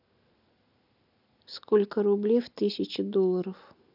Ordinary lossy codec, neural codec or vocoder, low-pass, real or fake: none; none; 5.4 kHz; real